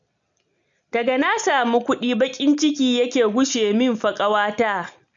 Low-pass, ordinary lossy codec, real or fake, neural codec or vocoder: 7.2 kHz; MP3, 64 kbps; real; none